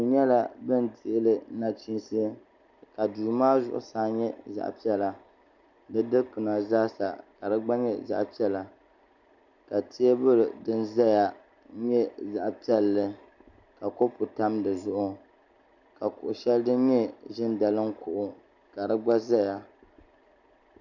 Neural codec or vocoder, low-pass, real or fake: none; 7.2 kHz; real